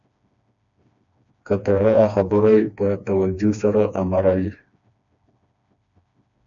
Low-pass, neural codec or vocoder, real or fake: 7.2 kHz; codec, 16 kHz, 2 kbps, FreqCodec, smaller model; fake